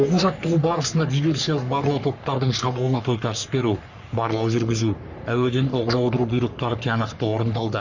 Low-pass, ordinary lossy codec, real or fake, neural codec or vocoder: 7.2 kHz; none; fake; codec, 44.1 kHz, 3.4 kbps, Pupu-Codec